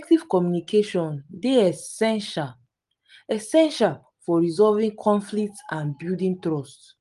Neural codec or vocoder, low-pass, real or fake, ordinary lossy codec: none; 10.8 kHz; real; Opus, 32 kbps